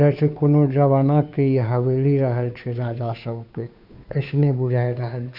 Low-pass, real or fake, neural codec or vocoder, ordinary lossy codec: 5.4 kHz; fake; codec, 16 kHz, 2 kbps, FunCodec, trained on Chinese and English, 25 frames a second; none